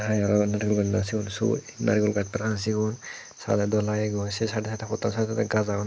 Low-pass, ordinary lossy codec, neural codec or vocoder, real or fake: none; none; none; real